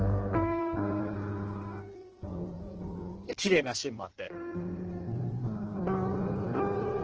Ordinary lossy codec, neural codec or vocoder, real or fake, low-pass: Opus, 16 kbps; codec, 16 kHz in and 24 kHz out, 1.1 kbps, FireRedTTS-2 codec; fake; 7.2 kHz